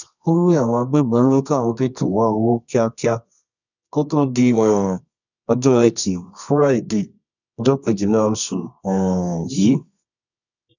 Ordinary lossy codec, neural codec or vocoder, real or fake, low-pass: none; codec, 24 kHz, 0.9 kbps, WavTokenizer, medium music audio release; fake; 7.2 kHz